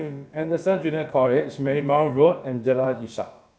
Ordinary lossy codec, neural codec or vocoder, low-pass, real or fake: none; codec, 16 kHz, about 1 kbps, DyCAST, with the encoder's durations; none; fake